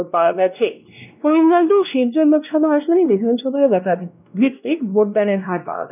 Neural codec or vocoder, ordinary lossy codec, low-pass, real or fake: codec, 16 kHz, 1 kbps, X-Codec, WavLM features, trained on Multilingual LibriSpeech; none; 3.6 kHz; fake